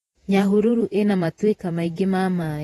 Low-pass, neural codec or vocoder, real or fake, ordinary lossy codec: 19.8 kHz; vocoder, 48 kHz, 128 mel bands, Vocos; fake; AAC, 32 kbps